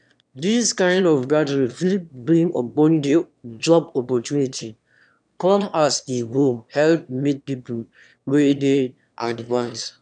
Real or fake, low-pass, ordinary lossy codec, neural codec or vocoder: fake; 9.9 kHz; none; autoencoder, 22.05 kHz, a latent of 192 numbers a frame, VITS, trained on one speaker